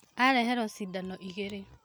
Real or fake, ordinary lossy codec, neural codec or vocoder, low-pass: real; none; none; none